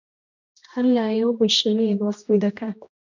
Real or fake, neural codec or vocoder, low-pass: fake; codec, 16 kHz, 1 kbps, X-Codec, HuBERT features, trained on general audio; 7.2 kHz